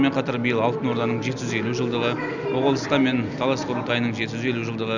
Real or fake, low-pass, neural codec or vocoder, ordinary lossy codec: real; 7.2 kHz; none; none